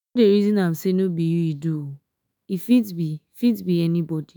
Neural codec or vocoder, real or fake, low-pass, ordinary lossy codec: autoencoder, 48 kHz, 128 numbers a frame, DAC-VAE, trained on Japanese speech; fake; none; none